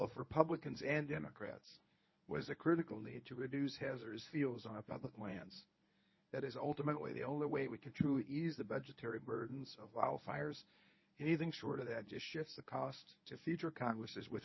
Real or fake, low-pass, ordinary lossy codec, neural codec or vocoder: fake; 7.2 kHz; MP3, 24 kbps; codec, 24 kHz, 0.9 kbps, WavTokenizer, medium speech release version 1